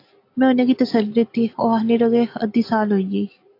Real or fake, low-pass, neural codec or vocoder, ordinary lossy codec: real; 5.4 kHz; none; AAC, 32 kbps